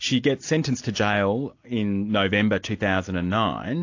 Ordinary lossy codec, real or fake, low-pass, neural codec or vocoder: AAC, 48 kbps; real; 7.2 kHz; none